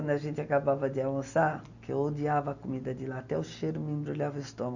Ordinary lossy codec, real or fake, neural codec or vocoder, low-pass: none; real; none; 7.2 kHz